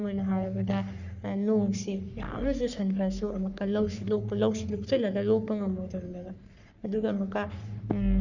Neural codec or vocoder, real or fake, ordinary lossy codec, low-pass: codec, 44.1 kHz, 3.4 kbps, Pupu-Codec; fake; none; 7.2 kHz